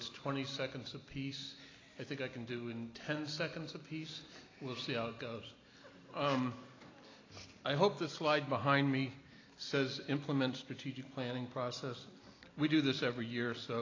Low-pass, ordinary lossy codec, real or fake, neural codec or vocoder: 7.2 kHz; AAC, 32 kbps; real; none